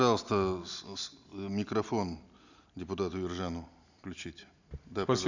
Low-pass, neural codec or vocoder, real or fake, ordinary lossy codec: 7.2 kHz; none; real; none